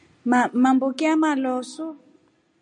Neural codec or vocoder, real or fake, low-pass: none; real; 9.9 kHz